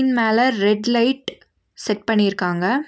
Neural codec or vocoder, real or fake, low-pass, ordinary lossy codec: none; real; none; none